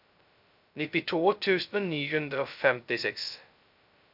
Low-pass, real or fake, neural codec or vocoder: 5.4 kHz; fake; codec, 16 kHz, 0.2 kbps, FocalCodec